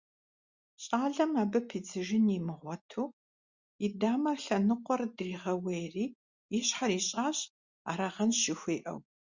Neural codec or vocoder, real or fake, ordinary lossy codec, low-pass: none; real; Opus, 64 kbps; 7.2 kHz